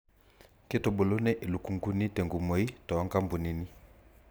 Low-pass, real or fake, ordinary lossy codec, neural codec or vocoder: none; real; none; none